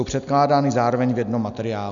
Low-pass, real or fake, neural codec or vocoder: 7.2 kHz; real; none